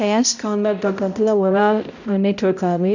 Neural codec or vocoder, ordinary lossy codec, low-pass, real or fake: codec, 16 kHz, 0.5 kbps, X-Codec, HuBERT features, trained on balanced general audio; none; 7.2 kHz; fake